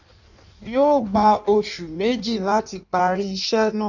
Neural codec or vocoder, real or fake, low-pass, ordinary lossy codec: codec, 16 kHz in and 24 kHz out, 1.1 kbps, FireRedTTS-2 codec; fake; 7.2 kHz; none